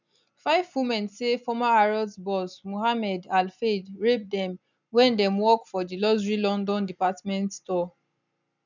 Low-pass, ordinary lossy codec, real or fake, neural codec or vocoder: 7.2 kHz; none; real; none